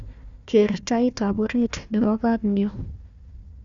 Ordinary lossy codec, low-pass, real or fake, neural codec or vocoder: Opus, 64 kbps; 7.2 kHz; fake; codec, 16 kHz, 1 kbps, FunCodec, trained on Chinese and English, 50 frames a second